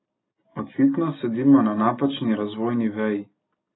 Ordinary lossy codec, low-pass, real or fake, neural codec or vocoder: AAC, 16 kbps; 7.2 kHz; real; none